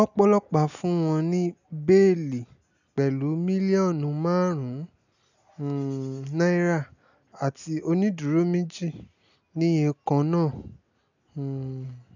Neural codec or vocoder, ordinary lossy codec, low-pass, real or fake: none; none; 7.2 kHz; real